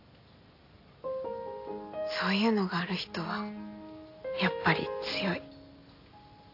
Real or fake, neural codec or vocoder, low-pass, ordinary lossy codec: real; none; 5.4 kHz; AAC, 32 kbps